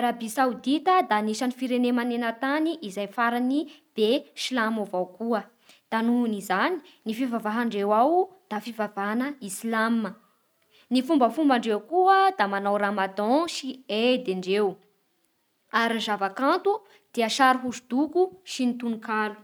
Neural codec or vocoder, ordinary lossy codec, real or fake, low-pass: none; none; real; none